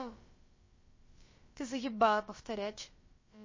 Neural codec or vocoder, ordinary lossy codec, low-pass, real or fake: codec, 16 kHz, about 1 kbps, DyCAST, with the encoder's durations; MP3, 32 kbps; 7.2 kHz; fake